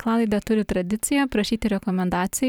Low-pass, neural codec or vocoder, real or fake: 19.8 kHz; vocoder, 44.1 kHz, 128 mel bands every 256 samples, BigVGAN v2; fake